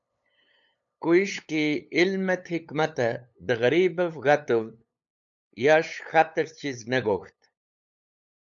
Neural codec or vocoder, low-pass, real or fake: codec, 16 kHz, 8 kbps, FunCodec, trained on LibriTTS, 25 frames a second; 7.2 kHz; fake